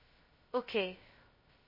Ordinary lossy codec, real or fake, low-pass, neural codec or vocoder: MP3, 24 kbps; fake; 5.4 kHz; codec, 16 kHz, 0.2 kbps, FocalCodec